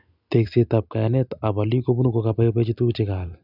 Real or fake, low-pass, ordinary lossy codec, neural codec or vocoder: real; 5.4 kHz; none; none